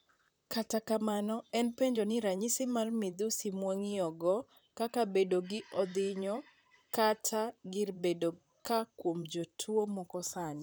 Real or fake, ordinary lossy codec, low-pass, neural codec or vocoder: fake; none; none; vocoder, 44.1 kHz, 128 mel bands, Pupu-Vocoder